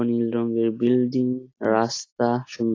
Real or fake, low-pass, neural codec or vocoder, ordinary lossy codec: real; 7.2 kHz; none; AAC, 32 kbps